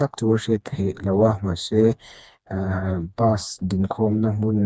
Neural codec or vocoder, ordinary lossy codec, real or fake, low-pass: codec, 16 kHz, 2 kbps, FreqCodec, smaller model; none; fake; none